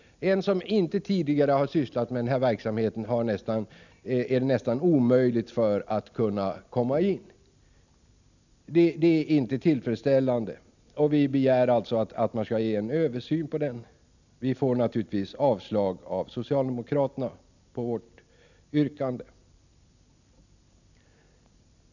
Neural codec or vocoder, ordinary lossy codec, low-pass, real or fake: none; none; 7.2 kHz; real